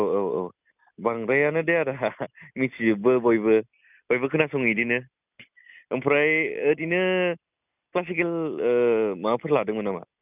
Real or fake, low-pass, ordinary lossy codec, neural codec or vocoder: real; 3.6 kHz; none; none